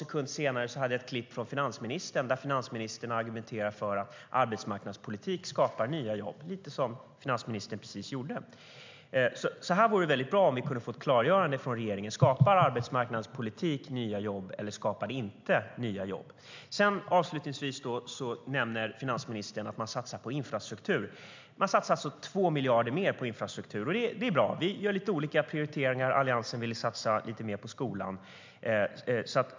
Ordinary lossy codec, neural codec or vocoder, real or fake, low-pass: MP3, 64 kbps; none; real; 7.2 kHz